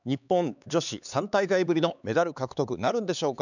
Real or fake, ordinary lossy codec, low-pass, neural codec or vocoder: fake; none; 7.2 kHz; codec, 16 kHz, 4 kbps, X-Codec, HuBERT features, trained on LibriSpeech